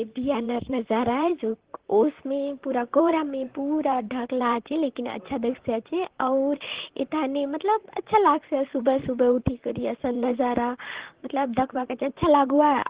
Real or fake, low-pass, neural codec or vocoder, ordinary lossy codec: fake; 3.6 kHz; vocoder, 44.1 kHz, 128 mel bands, Pupu-Vocoder; Opus, 16 kbps